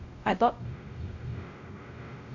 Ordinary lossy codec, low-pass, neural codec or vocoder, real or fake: none; 7.2 kHz; codec, 16 kHz, 0.5 kbps, X-Codec, WavLM features, trained on Multilingual LibriSpeech; fake